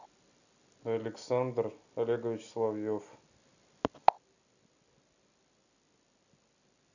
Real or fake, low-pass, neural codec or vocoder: real; 7.2 kHz; none